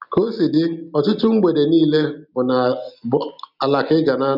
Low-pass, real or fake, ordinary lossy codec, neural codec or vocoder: 5.4 kHz; real; none; none